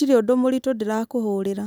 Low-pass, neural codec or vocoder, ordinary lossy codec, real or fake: none; none; none; real